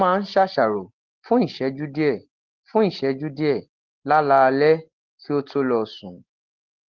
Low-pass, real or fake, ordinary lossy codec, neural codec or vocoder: 7.2 kHz; real; Opus, 16 kbps; none